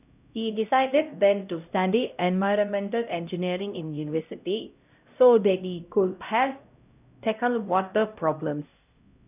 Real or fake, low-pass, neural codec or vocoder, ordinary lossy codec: fake; 3.6 kHz; codec, 16 kHz, 0.5 kbps, X-Codec, HuBERT features, trained on LibriSpeech; none